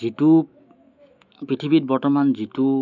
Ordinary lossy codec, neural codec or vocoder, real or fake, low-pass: none; autoencoder, 48 kHz, 128 numbers a frame, DAC-VAE, trained on Japanese speech; fake; 7.2 kHz